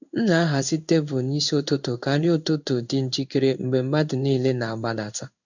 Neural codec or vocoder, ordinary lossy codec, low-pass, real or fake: codec, 16 kHz in and 24 kHz out, 1 kbps, XY-Tokenizer; none; 7.2 kHz; fake